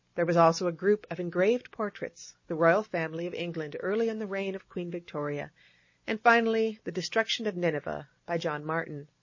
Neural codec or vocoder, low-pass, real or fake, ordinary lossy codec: none; 7.2 kHz; real; MP3, 32 kbps